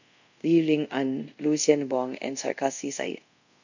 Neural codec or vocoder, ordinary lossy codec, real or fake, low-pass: codec, 24 kHz, 0.5 kbps, DualCodec; none; fake; 7.2 kHz